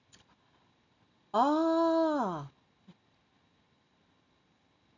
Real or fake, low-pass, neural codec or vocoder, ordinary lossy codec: real; 7.2 kHz; none; none